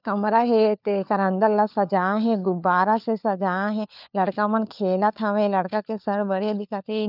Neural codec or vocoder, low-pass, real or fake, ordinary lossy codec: codec, 16 kHz, 4 kbps, FunCodec, trained on LibriTTS, 50 frames a second; 5.4 kHz; fake; none